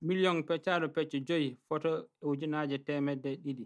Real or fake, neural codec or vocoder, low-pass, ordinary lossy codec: real; none; none; none